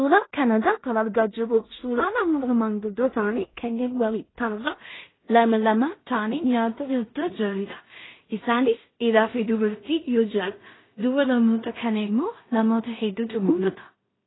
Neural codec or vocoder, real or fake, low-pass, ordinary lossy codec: codec, 16 kHz in and 24 kHz out, 0.4 kbps, LongCat-Audio-Codec, two codebook decoder; fake; 7.2 kHz; AAC, 16 kbps